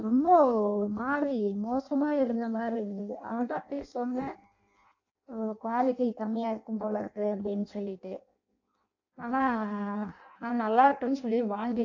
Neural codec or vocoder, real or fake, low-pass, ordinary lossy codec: codec, 16 kHz in and 24 kHz out, 0.6 kbps, FireRedTTS-2 codec; fake; 7.2 kHz; AAC, 48 kbps